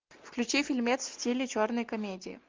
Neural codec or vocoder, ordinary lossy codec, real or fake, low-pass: none; Opus, 32 kbps; real; 7.2 kHz